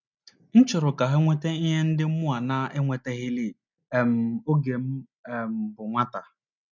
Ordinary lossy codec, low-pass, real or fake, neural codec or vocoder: none; 7.2 kHz; real; none